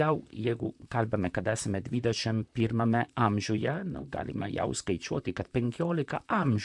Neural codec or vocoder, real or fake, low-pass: vocoder, 44.1 kHz, 128 mel bands, Pupu-Vocoder; fake; 10.8 kHz